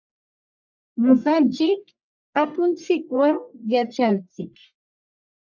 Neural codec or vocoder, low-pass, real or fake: codec, 44.1 kHz, 1.7 kbps, Pupu-Codec; 7.2 kHz; fake